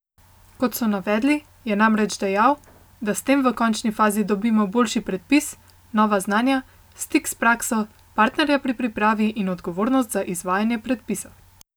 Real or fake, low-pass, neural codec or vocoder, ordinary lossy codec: real; none; none; none